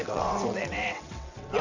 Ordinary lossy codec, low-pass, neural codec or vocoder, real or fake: none; 7.2 kHz; vocoder, 22.05 kHz, 80 mel bands, WaveNeXt; fake